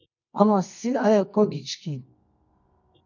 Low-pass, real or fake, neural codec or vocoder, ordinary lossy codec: 7.2 kHz; fake; codec, 24 kHz, 0.9 kbps, WavTokenizer, medium music audio release; MP3, 64 kbps